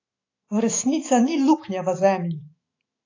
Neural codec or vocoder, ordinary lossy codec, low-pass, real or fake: autoencoder, 48 kHz, 128 numbers a frame, DAC-VAE, trained on Japanese speech; AAC, 32 kbps; 7.2 kHz; fake